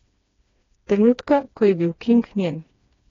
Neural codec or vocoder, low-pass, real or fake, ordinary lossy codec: codec, 16 kHz, 2 kbps, FreqCodec, smaller model; 7.2 kHz; fake; AAC, 32 kbps